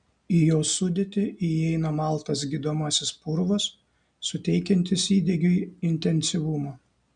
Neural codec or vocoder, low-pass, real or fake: none; 9.9 kHz; real